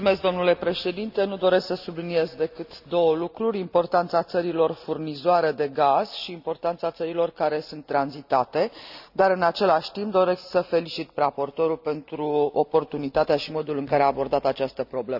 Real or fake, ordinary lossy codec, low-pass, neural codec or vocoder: real; none; 5.4 kHz; none